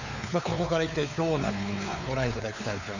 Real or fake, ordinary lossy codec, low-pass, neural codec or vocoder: fake; none; 7.2 kHz; codec, 16 kHz, 4 kbps, X-Codec, WavLM features, trained on Multilingual LibriSpeech